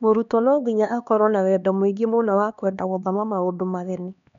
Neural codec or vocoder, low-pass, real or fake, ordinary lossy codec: codec, 16 kHz, 2 kbps, X-Codec, HuBERT features, trained on LibriSpeech; 7.2 kHz; fake; none